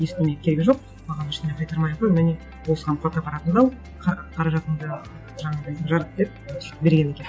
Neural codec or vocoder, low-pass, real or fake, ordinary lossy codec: none; none; real; none